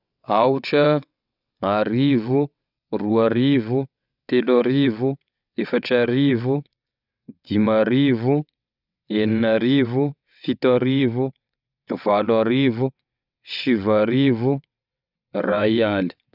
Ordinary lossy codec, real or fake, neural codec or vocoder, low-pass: none; fake; vocoder, 22.05 kHz, 80 mel bands, WaveNeXt; 5.4 kHz